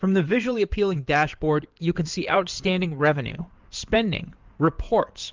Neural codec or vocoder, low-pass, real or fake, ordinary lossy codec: codec, 16 kHz in and 24 kHz out, 2.2 kbps, FireRedTTS-2 codec; 7.2 kHz; fake; Opus, 32 kbps